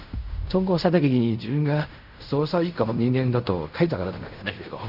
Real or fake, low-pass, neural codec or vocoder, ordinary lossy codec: fake; 5.4 kHz; codec, 16 kHz in and 24 kHz out, 0.4 kbps, LongCat-Audio-Codec, fine tuned four codebook decoder; none